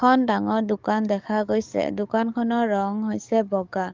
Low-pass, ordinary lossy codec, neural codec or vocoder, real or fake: 7.2 kHz; Opus, 32 kbps; codec, 44.1 kHz, 7.8 kbps, DAC; fake